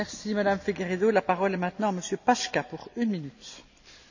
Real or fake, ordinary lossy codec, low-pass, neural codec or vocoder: real; none; 7.2 kHz; none